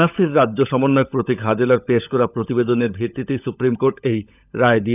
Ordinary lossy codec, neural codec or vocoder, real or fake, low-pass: none; codec, 16 kHz, 16 kbps, FunCodec, trained on LibriTTS, 50 frames a second; fake; 3.6 kHz